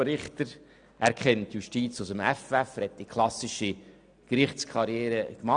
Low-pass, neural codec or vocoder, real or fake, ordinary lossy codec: 9.9 kHz; none; real; none